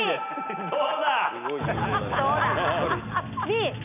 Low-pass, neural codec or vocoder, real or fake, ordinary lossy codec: 3.6 kHz; none; real; none